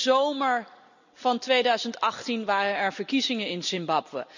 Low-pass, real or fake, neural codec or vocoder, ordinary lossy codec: 7.2 kHz; real; none; none